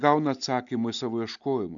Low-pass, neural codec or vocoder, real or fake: 7.2 kHz; none; real